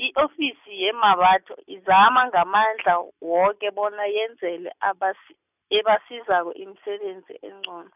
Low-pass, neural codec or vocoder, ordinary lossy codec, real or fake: 3.6 kHz; none; none; real